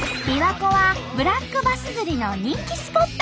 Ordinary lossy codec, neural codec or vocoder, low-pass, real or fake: none; none; none; real